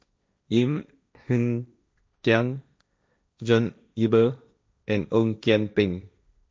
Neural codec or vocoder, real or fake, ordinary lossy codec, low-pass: codec, 16 kHz, 1.1 kbps, Voila-Tokenizer; fake; none; none